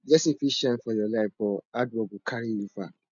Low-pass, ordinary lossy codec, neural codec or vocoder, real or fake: 7.2 kHz; none; none; real